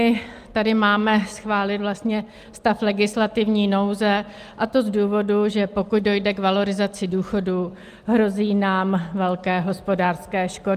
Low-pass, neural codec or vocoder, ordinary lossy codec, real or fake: 14.4 kHz; none; Opus, 32 kbps; real